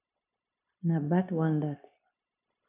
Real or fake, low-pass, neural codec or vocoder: fake; 3.6 kHz; codec, 16 kHz, 0.9 kbps, LongCat-Audio-Codec